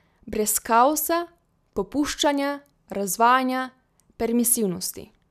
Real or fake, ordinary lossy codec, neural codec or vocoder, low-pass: real; none; none; 14.4 kHz